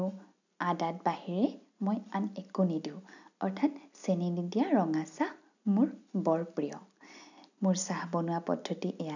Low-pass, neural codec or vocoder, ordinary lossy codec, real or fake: 7.2 kHz; none; none; real